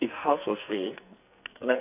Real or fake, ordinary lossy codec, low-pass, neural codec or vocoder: fake; none; 3.6 kHz; codec, 44.1 kHz, 2.6 kbps, SNAC